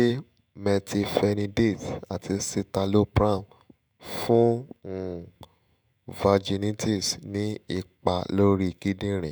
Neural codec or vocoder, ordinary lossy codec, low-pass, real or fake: autoencoder, 48 kHz, 128 numbers a frame, DAC-VAE, trained on Japanese speech; none; none; fake